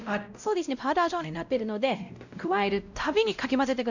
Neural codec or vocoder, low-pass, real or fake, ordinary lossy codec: codec, 16 kHz, 0.5 kbps, X-Codec, WavLM features, trained on Multilingual LibriSpeech; 7.2 kHz; fake; none